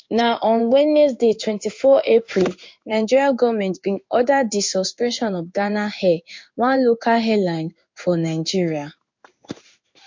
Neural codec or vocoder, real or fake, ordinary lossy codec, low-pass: codec, 16 kHz in and 24 kHz out, 1 kbps, XY-Tokenizer; fake; MP3, 48 kbps; 7.2 kHz